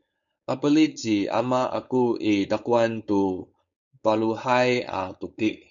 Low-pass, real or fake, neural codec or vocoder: 7.2 kHz; fake; codec, 16 kHz, 4.8 kbps, FACodec